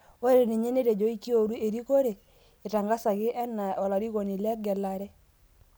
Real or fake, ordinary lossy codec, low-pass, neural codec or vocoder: real; none; none; none